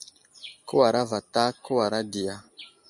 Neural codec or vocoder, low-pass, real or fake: none; 10.8 kHz; real